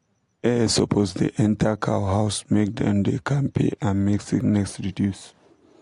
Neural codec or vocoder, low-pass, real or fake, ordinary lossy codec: none; 10.8 kHz; real; AAC, 48 kbps